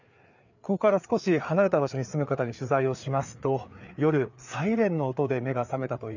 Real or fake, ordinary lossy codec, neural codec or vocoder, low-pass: fake; none; codec, 16 kHz, 4 kbps, FreqCodec, larger model; 7.2 kHz